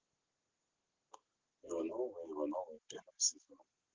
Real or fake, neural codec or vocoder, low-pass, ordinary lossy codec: real; none; 7.2 kHz; Opus, 16 kbps